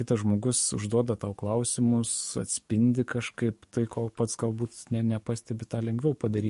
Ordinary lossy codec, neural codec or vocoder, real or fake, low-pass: MP3, 48 kbps; codec, 44.1 kHz, 7.8 kbps, DAC; fake; 14.4 kHz